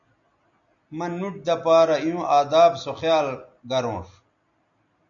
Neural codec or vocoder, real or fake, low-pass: none; real; 7.2 kHz